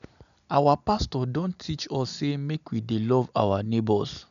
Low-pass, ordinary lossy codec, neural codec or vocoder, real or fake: 7.2 kHz; none; none; real